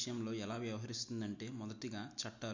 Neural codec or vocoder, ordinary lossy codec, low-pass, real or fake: none; MP3, 48 kbps; 7.2 kHz; real